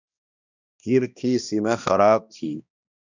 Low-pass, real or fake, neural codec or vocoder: 7.2 kHz; fake; codec, 16 kHz, 1 kbps, X-Codec, HuBERT features, trained on balanced general audio